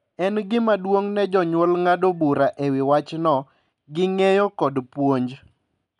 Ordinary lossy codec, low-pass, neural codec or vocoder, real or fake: none; 10.8 kHz; none; real